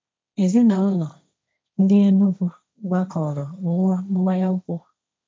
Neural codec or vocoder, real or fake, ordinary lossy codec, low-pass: codec, 16 kHz, 1.1 kbps, Voila-Tokenizer; fake; none; none